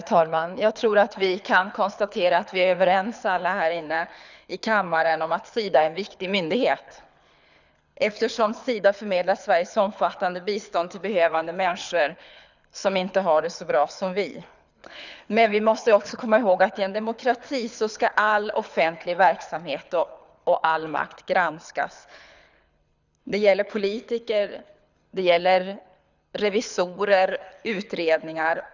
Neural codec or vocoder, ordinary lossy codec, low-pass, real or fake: codec, 24 kHz, 6 kbps, HILCodec; none; 7.2 kHz; fake